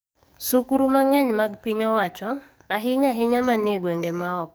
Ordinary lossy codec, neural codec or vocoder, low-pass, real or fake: none; codec, 44.1 kHz, 2.6 kbps, SNAC; none; fake